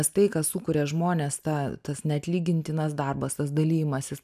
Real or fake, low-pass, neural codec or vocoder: real; 14.4 kHz; none